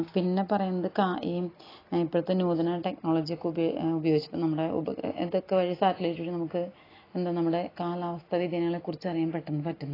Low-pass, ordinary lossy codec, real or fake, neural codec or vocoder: 5.4 kHz; AAC, 24 kbps; real; none